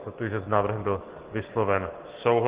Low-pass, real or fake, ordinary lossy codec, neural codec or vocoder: 3.6 kHz; real; Opus, 16 kbps; none